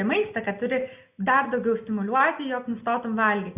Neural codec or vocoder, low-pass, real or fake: none; 3.6 kHz; real